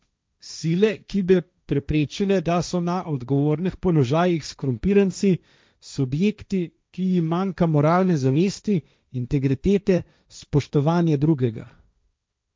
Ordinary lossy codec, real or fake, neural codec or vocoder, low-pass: none; fake; codec, 16 kHz, 1.1 kbps, Voila-Tokenizer; none